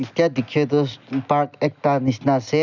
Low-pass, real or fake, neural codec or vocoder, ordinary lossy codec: 7.2 kHz; real; none; none